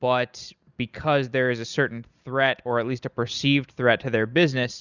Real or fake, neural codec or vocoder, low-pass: real; none; 7.2 kHz